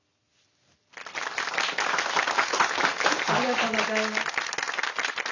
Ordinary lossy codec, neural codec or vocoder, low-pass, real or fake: none; none; 7.2 kHz; real